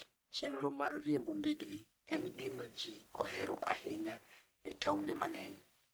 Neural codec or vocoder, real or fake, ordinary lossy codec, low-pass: codec, 44.1 kHz, 1.7 kbps, Pupu-Codec; fake; none; none